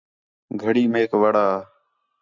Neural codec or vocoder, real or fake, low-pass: none; real; 7.2 kHz